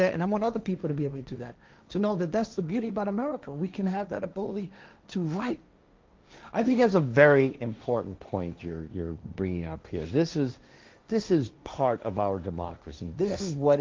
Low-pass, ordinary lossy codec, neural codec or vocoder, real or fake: 7.2 kHz; Opus, 24 kbps; codec, 16 kHz, 1.1 kbps, Voila-Tokenizer; fake